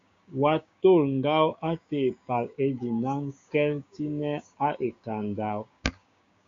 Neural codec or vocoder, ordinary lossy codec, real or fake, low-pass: codec, 16 kHz, 6 kbps, DAC; AAC, 64 kbps; fake; 7.2 kHz